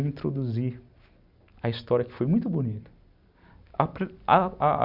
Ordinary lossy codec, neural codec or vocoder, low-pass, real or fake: none; none; 5.4 kHz; real